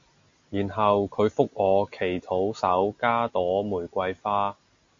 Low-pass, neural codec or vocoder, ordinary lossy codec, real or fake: 7.2 kHz; none; MP3, 48 kbps; real